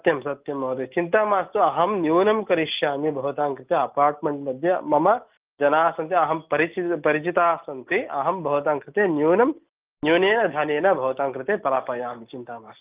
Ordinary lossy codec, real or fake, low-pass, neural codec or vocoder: Opus, 24 kbps; real; 3.6 kHz; none